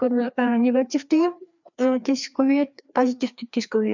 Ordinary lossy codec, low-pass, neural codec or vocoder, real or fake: none; 7.2 kHz; codec, 16 kHz, 2 kbps, FreqCodec, larger model; fake